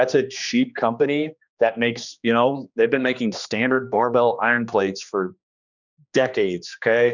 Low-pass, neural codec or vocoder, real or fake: 7.2 kHz; codec, 16 kHz, 2 kbps, X-Codec, HuBERT features, trained on general audio; fake